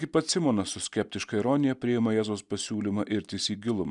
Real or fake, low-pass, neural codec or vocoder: real; 10.8 kHz; none